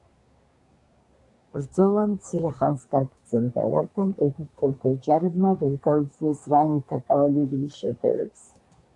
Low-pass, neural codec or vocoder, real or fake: 10.8 kHz; codec, 24 kHz, 1 kbps, SNAC; fake